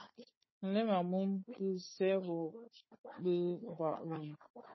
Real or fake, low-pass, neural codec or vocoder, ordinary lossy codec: fake; 7.2 kHz; codec, 16 kHz, 4 kbps, FunCodec, trained on Chinese and English, 50 frames a second; MP3, 24 kbps